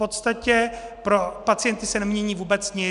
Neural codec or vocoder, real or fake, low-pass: none; real; 10.8 kHz